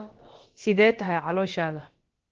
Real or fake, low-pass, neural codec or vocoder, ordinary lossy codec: fake; 7.2 kHz; codec, 16 kHz, about 1 kbps, DyCAST, with the encoder's durations; Opus, 16 kbps